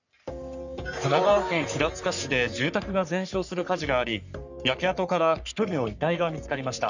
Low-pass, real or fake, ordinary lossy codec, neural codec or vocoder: 7.2 kHz; fake; none; codec, 44.1 kHz, 3.4 kbps, Pupu-Codec